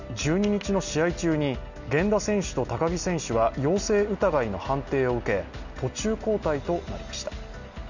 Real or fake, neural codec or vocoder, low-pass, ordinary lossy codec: real; none; 7.2 kHz; none